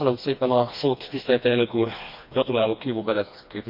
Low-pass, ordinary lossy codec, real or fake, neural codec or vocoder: 5.4 kHz; MP3, 48 kbps; fake; codec, 16 kHz, 2 kbps, FreqCodec, smaller model